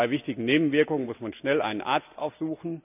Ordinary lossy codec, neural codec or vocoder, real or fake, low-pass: none; none; real; 3.6 kHz